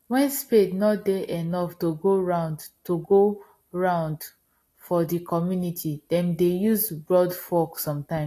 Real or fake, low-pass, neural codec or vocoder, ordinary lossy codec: real; 14.4 kHz; none; AAC, 48 kbps